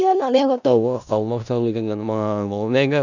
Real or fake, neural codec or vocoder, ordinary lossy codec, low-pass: fake; codec, 16 kHz in and 24 kHz out, 0.4 kbps, LongCat-Audio-Codec, four codebook decoder; none; 7.2 kHz